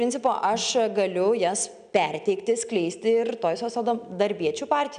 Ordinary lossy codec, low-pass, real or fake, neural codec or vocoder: MP3, 96 kbps; 9.9 kHz; real; none